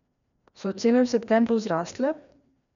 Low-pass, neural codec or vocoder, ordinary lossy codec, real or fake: 7.2 kHz; codec, 16 kHz, 1 kbps, FreqCodec, larger model; none; fake